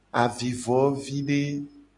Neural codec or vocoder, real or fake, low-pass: none; real; 10.8 kHz